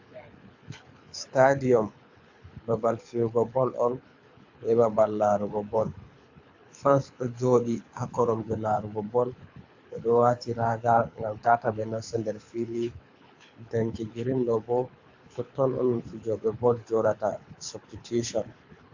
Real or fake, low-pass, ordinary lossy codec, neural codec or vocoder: fake; 7.2 kHz; AAC, 48 kbps; codec, 24 kHz, 6 kbps, HILCodec